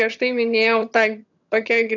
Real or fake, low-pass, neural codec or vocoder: fake; 7.2 kHz; vocoder, 22.05 kHz, 80 mel bands, HiFi-GAN